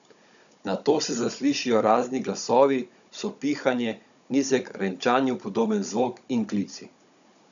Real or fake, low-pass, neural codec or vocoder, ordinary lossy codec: fake; 7.2 kHz; codec, 16 kHz, 16 kbps, FunCodec, trained on Chinese and English, 50 frames a second; none